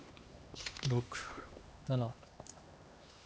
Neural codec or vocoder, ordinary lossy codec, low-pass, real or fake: codec, 16 kHz, 2 kbps, X-Codec, HuBERT features, trained on LibriSpeech; none; none; fake